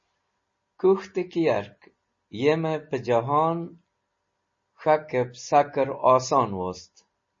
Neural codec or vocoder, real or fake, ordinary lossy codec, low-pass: none; real; MP3, 32 kbps; 7.2 kHz